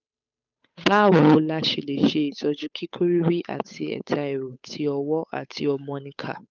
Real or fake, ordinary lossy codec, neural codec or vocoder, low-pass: fake; none; codec, 16 kHz, 8 kbps, FunCodec, trained on Chinese and English, 25 frames a second; 7.2 kHz